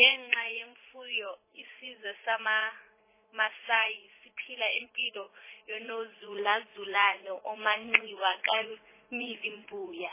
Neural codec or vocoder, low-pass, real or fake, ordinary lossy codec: vocoder, 44.1 kHz, 80 mel bands, Vocos; 3.6 kHz; fake; MP3, 16 kbps